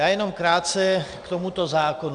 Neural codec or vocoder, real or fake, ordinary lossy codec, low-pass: none; real; Opus, 64 kbps; 9.9 kHz